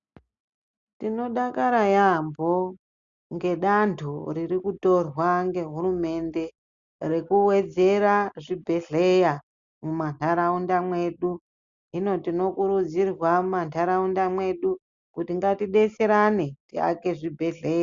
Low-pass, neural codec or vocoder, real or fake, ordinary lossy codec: 7.2 kHz; none; real; Opus, 64 kbps